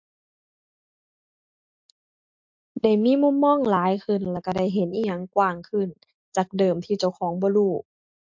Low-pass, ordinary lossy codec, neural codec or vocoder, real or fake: 7.2 kHz; MP3, 48 kbps; none; real